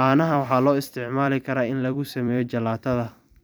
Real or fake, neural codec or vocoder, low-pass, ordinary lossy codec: fake; vocoder, 44.1 kHz, 128 mel bands every 256 samples, BigVGAN v2; none; none